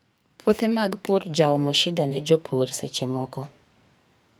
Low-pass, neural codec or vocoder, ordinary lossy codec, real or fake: none; codec, 44.1 kHz, 2.6 kbps, SNAC; none; fake